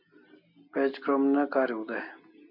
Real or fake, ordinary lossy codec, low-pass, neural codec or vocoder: real; MP3, 48 kbps; 5.4 kHz; none